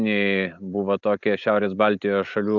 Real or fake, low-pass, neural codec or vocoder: real; 7.2 kHz; none